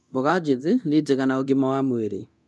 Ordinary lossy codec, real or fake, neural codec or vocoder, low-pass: none; fake; codec, 24 kHz, 0.9 kbps, DualCodec; none